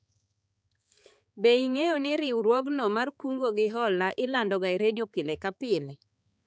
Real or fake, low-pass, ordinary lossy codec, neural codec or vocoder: fake; none; none; codec, 16 kHz, 4 kbps, X-Codec, HuBERT features, trained on balanced general audio